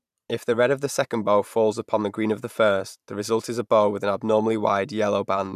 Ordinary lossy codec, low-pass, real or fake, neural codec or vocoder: none; 14.4 kHz; fake; vocoder, 44.1 kHz, 128 mel bands every 512 samples, BigVGAN v2